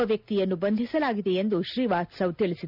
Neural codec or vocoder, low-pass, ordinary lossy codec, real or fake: none; 5.4 kHz; none; real